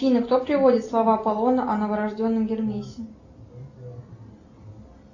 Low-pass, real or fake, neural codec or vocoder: 7.2 kHz; real; none